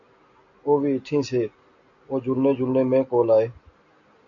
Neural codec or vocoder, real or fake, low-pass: none; real; 7.2 kHz